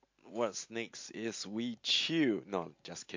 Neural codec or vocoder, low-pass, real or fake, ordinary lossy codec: none; 7.2 kHz; real; MP3, 48 kbps